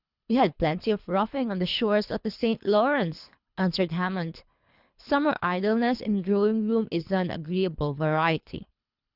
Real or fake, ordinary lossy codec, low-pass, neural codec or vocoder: fake; Opus, 64 kbps; 5.4 kHz; codec, 24 kHz, 3 kbps, HILCodec